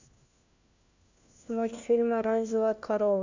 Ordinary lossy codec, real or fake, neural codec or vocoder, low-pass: AAC, 48 kbps; fake; codec, 16 kHz, 1 kbps, FunCodec, trained on LibriTTS, 50 frames a second; 7.2 kHz